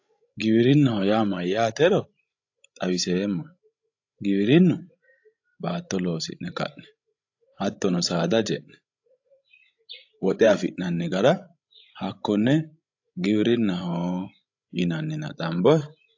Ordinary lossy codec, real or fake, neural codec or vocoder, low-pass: AAC, 48 kbps; fake; codec, 16 kHz, 16 kbps, FreqCodec, larger model; 7.2 kHz